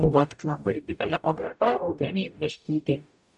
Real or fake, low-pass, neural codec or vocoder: fake; 10.8 kHz; codec, 44.1 kHz, 0.9 kbps, DAC